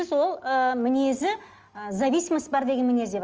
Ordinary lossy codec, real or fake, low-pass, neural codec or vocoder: Opus, 24 kbps; real; 7.2 kHz; none